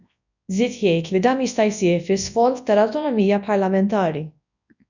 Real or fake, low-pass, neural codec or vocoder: fake; 7.2 kHz; codec, 24 kHz, 0.9 kbps, WavTokenizer, large speech release